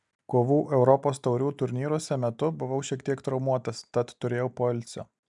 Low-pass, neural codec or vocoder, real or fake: 10.8 kHz; none; real